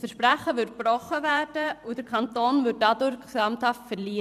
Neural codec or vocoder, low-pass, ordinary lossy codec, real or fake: vocoder, 44.1 kHz, 128 mel bands every 512 samples, BigVGAN v2; 14.4 kHz; none; fake